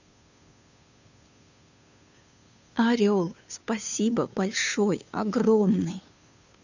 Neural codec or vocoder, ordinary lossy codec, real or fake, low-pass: codec, 16 kHz, 2 kbps, FunCodec, trained on Chinese and English, 25 frames a second; none; fake; 7.2 kHz